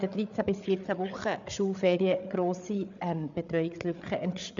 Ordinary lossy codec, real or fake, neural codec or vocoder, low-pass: MP3, 64 kbps; fake; codec, 16 kHz, 8 kbps, FreqCodec, larger model; 7.2 kHz